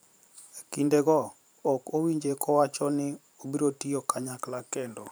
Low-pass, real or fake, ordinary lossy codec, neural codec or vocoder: none; fake; none; vocoder, 44.1 kHz, 128 mel bands every 512 samples, BigVGAN v2